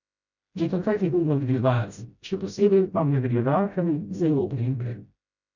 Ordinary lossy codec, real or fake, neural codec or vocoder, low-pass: none; fake; codec, 16 kHz, 0.5 kbps, FreqCodec, smaller model; 7.2 kHz